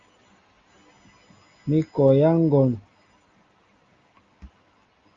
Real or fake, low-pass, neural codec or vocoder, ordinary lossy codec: real; 7.2 kHz; none; Opus, 32 kbps